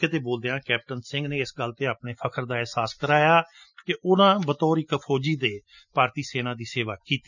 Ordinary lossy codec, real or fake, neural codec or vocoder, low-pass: none; real; none; 7.2 kHz